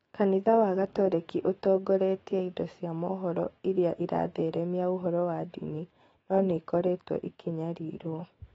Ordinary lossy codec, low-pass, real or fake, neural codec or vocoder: AAC, 24 kbps; 19.8 kHz; fake; autoencoder, 48 kHz, 128 numbers a frame, DAC-VAE, trained on Japanese speech